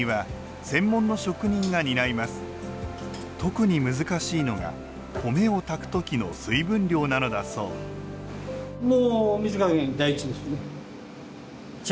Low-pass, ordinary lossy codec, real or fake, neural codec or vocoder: none; none; real; none